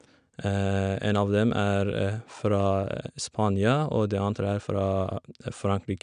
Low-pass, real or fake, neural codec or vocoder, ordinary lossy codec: 9.9 kHz; real; none; none